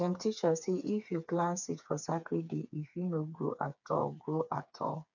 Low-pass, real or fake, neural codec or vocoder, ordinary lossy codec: 7.2 kHz; fake; codec, 16 kHz, 4 kbps, FreqCodec, smaller model; none